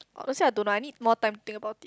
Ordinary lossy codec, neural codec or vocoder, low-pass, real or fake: none; none; none; real